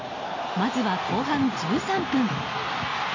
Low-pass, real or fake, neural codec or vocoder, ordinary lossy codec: 7.2 kHz; real; none; AAC, 48 kbps